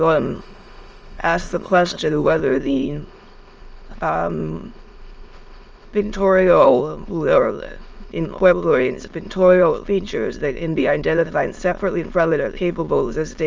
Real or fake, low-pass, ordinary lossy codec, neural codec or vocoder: fake; 7.2 kHz; Opus, 24 kbps; autoencoder, 22.05 kHz, a latent of 192 numbers a frame, VITS, trained on many speakers